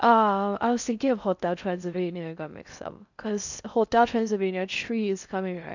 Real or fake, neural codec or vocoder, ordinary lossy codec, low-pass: fake; codec, 16 kHz in and 24 kHz out, 0.6 kbps, FocalCodec, streaming, 4096 codes; none; 7.2 kHz